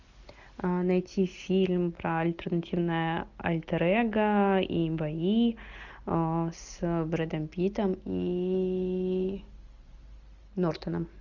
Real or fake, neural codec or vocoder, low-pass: real; none; 7.2 kHz